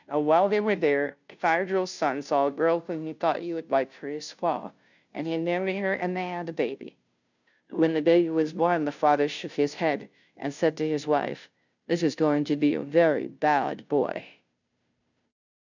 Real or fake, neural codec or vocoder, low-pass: fake; codec, 16 kHz, 0.5 kbps, FunCodec, trained on Chinese and English, 25 frames a second; 7.2 kHz